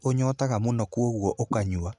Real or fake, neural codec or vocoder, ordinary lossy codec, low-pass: real; none; none; 10.8 kHz